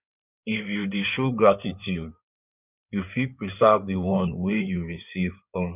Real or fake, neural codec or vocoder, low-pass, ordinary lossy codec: fake; codec, 16 kHz in and 24 kHz out, 2.2 kbps, FireRedTTS-2 codec; 3.6 kHz; none